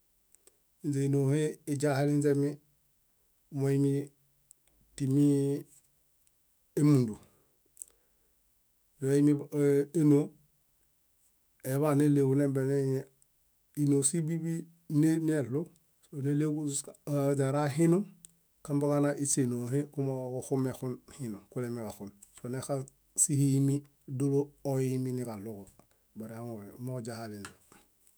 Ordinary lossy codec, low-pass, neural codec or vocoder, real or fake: none; none; autoencoder, 48 kHz, 128 numbers a frame, DAC-VAE, trained on Japanese speech; fake